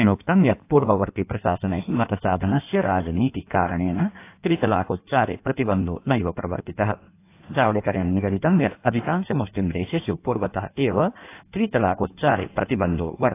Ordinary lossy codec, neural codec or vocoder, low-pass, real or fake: AAC, 24 kbps; codec, 16 kHz in and 24 kHz out, 1.1 kbps, FireRedTTS-2 codec; 3.6 kHz; fake